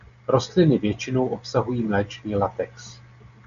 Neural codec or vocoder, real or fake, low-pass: none; real; 7.2 kHz